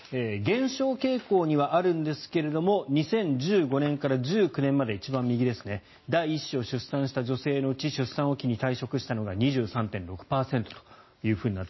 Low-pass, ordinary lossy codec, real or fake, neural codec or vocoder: 7.2 kHz; MP3, 24 kbps; real; none